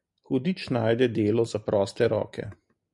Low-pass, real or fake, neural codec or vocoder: 10.8 kHz; real; none